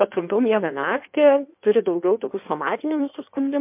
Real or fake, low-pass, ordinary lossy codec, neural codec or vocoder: fake; 3.6 kHz; MP3, 32 kbps; codec, 16 kHz in and 24 kHz out, 1.1 kbps, FireRedTTS-2 codec